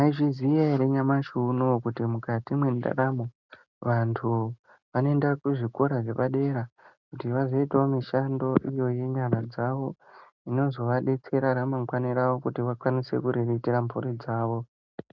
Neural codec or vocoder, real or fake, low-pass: none; real; 7.2 kHz